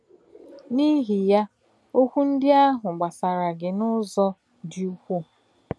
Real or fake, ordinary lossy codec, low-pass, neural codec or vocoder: real; none; none; none